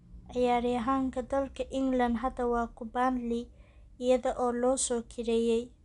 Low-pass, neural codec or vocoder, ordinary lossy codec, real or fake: 10.8 kHz; none; none; real